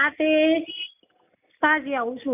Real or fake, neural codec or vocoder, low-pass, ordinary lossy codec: real; none; 3.6 kHz; none